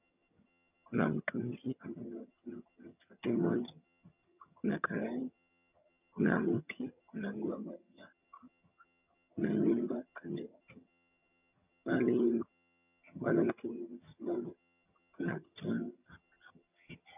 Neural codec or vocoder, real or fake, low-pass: vocoder, 22.05 kHz, 80 mel bands, HiFi-GAN; fake; 3.6 kHz